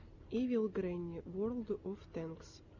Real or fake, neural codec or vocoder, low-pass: real; none; 7.2 kHz